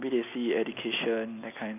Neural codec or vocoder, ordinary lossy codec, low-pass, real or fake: none; none; 3.6 kHz; real